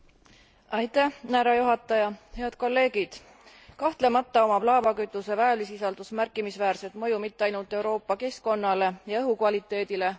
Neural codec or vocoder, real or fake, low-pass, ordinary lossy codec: none; real; none; none